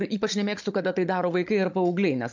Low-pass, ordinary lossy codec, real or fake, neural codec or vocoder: 7.2 kHz; MP3, 64 kbps; fake; codec, 16 kHz, 16 kbps, FunCodec, trained on Chinese and English, 50 frames a second